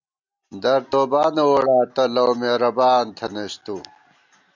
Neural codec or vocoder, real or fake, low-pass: none; real; 7.2 kHz